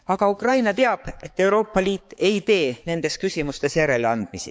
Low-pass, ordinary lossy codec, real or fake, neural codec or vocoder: none; none; fake; codec, 16 kHz, 4 kbps, X-Codec, HuBERT features, trained on balanced general audio